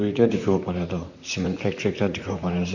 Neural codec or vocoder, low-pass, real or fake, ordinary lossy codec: vocoder, 44.1 kHz, 128 mel bands, Pupu-Vocoder; 7.2 kHz; fake; none